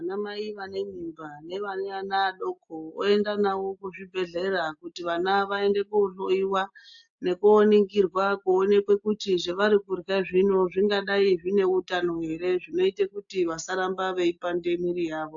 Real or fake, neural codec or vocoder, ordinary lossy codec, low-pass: real; none; AAC, 64 kbps; 7.2 kHz